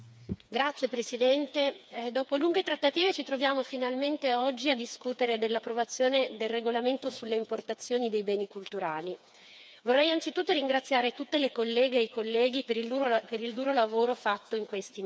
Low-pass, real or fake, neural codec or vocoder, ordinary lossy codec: none; fake; codec, 16 kHz, 4 kbps, FreqCodec, smaller model; none